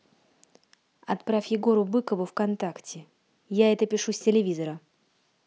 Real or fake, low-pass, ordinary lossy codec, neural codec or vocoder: real; none; none; none